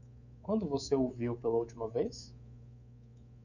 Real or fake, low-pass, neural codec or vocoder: fake; 7.2 kHz; codec, 24 kHz, 3.1 kbps, DualCodec